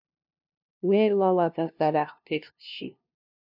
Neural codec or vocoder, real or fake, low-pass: codec, 16 kHz, 0.5 kbps, FunCodec, trained on LibriTTS, 25 frames a second; fake; 5.4 kHz